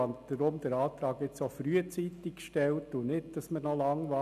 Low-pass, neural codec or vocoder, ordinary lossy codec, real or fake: 14.4 kHz; none; none; real